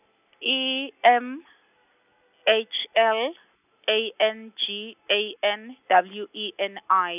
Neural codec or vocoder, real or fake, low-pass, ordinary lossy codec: none; real; 3.6 kHz; none